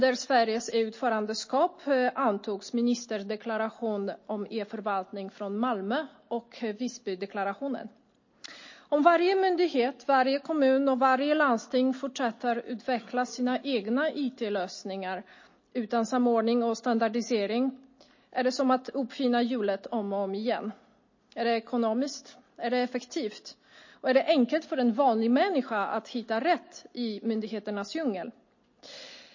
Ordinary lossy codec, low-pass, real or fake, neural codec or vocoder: MP3, 32 kbps; 7.2 kHz; real; none